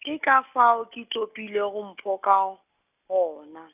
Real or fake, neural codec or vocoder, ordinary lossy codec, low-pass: real; none; none; 3.6 kHz